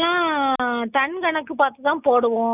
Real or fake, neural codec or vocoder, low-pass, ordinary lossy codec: real; none; 3.6 kHz; none